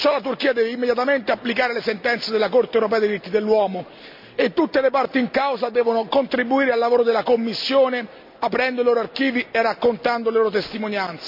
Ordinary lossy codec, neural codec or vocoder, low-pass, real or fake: MP3, 48 kbps; none; 5.4 kHz; real